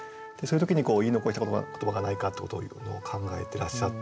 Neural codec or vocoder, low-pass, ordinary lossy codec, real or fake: none; none; none; real